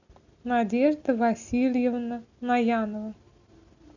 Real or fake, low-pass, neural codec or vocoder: real; 7.2 kHz; none